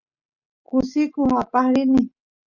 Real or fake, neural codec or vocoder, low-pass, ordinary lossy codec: real; none; 7.2 kHz; Opus, 64 kbps